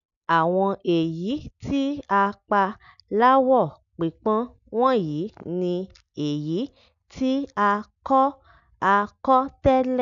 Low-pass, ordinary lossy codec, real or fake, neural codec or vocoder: 7.2 kHz; none; real; none